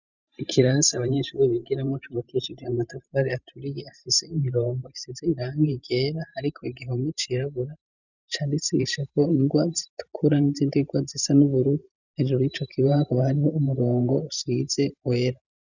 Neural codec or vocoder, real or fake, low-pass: vocoder, 44.1 kHz, 128 mel bands every 512 samples, BigVGAN v2; fake; 7.2 kHz